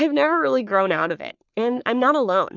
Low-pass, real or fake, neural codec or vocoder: 7.2 kHz; fake; codec, 44.1 kHz, 3.4 kbps, Pupu-Codec